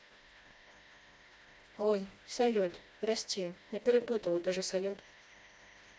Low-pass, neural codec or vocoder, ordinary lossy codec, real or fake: none; codec, 16 kHz, 1 kbps, FreqCodec, smaller model; none; fake